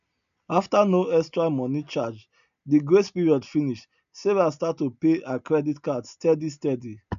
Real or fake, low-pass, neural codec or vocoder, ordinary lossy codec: real; 7.2 kHz; none; none